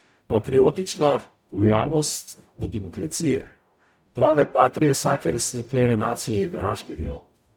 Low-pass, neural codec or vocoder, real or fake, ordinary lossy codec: none; codec, 44.1 kHz, 0.9 kbps, DAC; fake; none